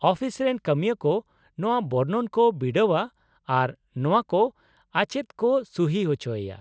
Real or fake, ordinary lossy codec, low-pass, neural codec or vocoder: real; none; none; none